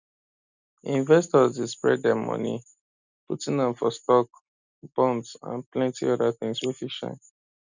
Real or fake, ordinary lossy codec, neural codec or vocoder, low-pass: real; none; none; 7.2 kHz